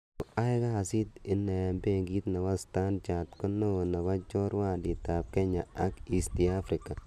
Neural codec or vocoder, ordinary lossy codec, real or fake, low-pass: none; none; real; 14.4 kHz